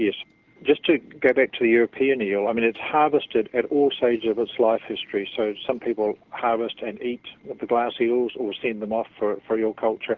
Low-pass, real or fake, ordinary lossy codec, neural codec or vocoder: 7.2 kHz; real; Opus, 16 kbps; none